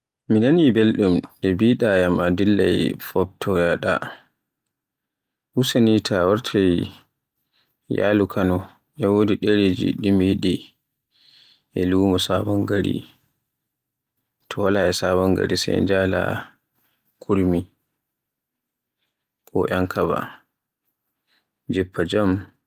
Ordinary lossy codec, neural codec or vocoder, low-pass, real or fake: Opus, 32 kbps; none; 14.4 kHz; real